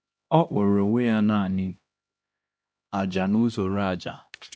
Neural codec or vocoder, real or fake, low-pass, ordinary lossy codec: codec, 16 kHz, 1 kbps, X-Codec, HuBERT features, trained on LibriSpeech; fake; none; none